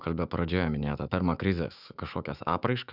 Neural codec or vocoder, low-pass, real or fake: autoencoder, 48 kHz, 128 numbers a frame, DAC-VAE, trained on Japanese speech; 5.4 kHz; fake